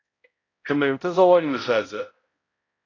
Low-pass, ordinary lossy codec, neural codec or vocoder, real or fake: 7.2 kHz; AAC, 32 kbps; codec, 16 kHz, 0.5 kbps, X-Codec, HuBERT features, trained on balanced general audio; fake